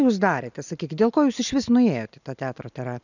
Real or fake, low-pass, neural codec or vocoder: real; 7.2 kHz; none